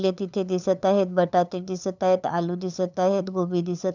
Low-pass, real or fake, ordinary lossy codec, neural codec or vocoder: 7.2 kHz; fake; none; codec, 16 kHz, 8 kbps, FunCodec, trained on LibriTTS, 25 frames a second